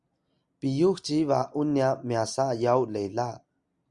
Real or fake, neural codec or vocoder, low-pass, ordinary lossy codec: real; none; 10.8 kHz; Opus, 64 kbps